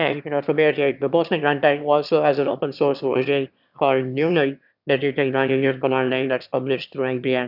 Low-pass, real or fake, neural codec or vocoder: 5.4 kHz; fake; autoencoder, 22.05 kHz, a latent of 192 numbers a frame, VITS, trained on one speaker